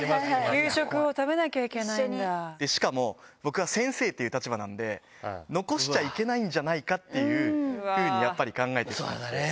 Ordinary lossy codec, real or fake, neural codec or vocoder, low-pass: none; real; none; none